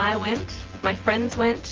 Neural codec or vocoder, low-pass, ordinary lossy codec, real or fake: vocoder, 24 kHz, 100 mel bands, Vocos; 7.2 kHz; Opus, 16 kbps; fake